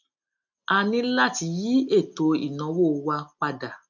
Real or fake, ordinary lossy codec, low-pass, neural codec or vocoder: real; none; 7.2 kHz; none